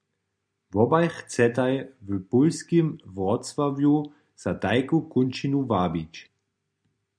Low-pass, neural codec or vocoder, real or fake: 9.9 kHz; none; real